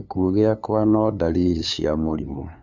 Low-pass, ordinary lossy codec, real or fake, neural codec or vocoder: none; none; fake; codec, 16 kHz, 2 kbps, FunCodec, trained on LibriTTS, 25 frames a second